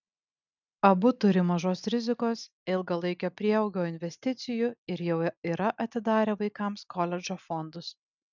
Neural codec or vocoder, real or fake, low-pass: none; real; 7.2 kHz